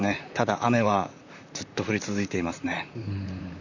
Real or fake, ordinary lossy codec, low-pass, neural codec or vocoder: fake; none; 7.2 kHz; vocoder, 44.1 kHz, 128 mel bands, Pupu-Vocoder